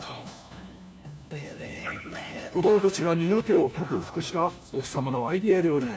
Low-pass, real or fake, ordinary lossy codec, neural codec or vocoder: none; fake; none; codec, 16 kHz, 1 kbps, FunCodec, trained on LibriTTS, 50 frames a second